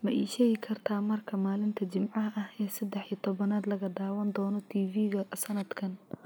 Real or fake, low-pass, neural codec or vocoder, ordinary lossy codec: real; none; none; none